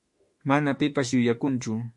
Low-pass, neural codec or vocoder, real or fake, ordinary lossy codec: 10.8 kHz; autoencoder, 48 kHz, 32 numbers a frame, DAC-VAE, trained on Japanese speech; fake; MP3, 48 kbps